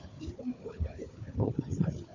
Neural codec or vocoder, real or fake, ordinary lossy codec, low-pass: codec, 16 kHz, 4 kbps, FunCodec, trained on Chinese and English, 50 frames a second; fake; none; 7.2 kHz